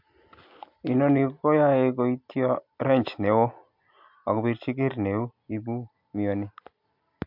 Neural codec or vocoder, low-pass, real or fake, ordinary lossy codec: none; 5.4 kHz; real; MP3, 48 kbps